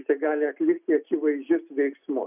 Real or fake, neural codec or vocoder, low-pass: real; none; 3.6 kHz